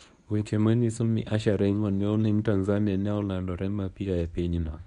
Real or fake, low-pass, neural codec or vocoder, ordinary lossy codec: fake; 10.8 kHz; codec, 24 kHz, 0.9 kbps, WavTokenizer, medium speech release version 1; none